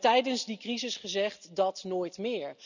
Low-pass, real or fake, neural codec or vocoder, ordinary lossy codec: 7.2 kHz; real; none; none